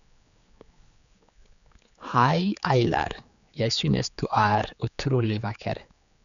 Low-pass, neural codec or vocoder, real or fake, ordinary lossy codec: 7.2 kHz; codec, 16 kHz, 4 kbps, X-Codec, HuBERT features, trained on general audio; fake; none